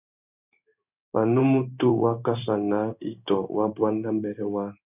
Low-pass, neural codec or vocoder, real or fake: 3.6 kHz; codec, 16 kHz in and 24 kHz out, 1 kbps, XY-Tokenizer; fake